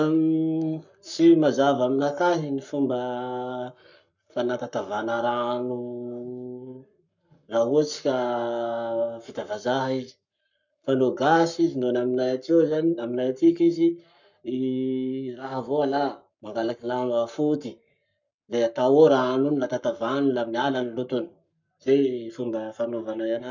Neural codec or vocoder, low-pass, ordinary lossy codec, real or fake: codec, 44.1 kHz, 7.8 kbps, Pupu-Codec; 7.2 kHz; none; fake